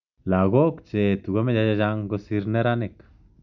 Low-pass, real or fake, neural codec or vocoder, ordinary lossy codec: 7.2 kHz; real; none; none